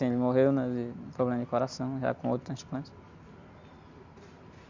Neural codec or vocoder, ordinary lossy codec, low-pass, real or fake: none; Opus, 64 kbps; 7.2 kHz; real